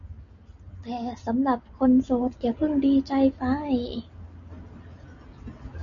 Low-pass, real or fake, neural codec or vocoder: 7.2 kHz; real; none